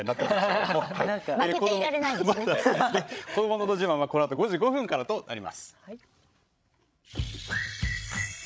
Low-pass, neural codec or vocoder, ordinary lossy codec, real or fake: none; codec, 16 kHz, 16 kbps, FreqCodec, larger model; none; fake